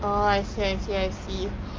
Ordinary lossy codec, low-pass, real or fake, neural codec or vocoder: Opus, 24 kbps; 7.2 kHz; real; none